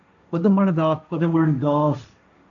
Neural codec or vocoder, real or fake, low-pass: codec, 16 kHz, 1.1 kbps, Voila-Tokenizer; fake; 7.2 kHz